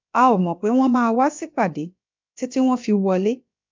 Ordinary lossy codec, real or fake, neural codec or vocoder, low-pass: MP3, 64 kbps; fake; codec, 16 kHz, about 1 kbps, DyCAST, with the encoder's durations; 7.2 kHz